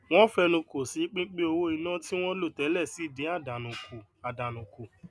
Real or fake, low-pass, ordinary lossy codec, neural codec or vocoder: real; none; none; none